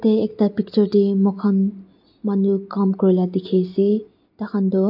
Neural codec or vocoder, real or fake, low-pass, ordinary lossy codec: none; real; 5.4 kHz; AAC, 48 kbps